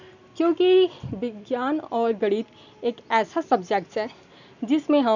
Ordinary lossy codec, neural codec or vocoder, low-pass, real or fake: none; none; 7.2 kHz; real